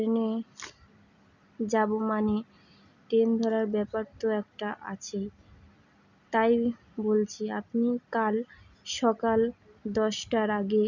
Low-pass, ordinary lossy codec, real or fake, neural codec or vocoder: 7.2 kHz; none; real; none